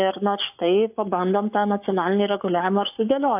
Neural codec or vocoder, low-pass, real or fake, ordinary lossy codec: codec, 44.1 kHz, 7.8 kbps, Pupu-Codec; 3.6 kHz; fake; AAC, 32 kbps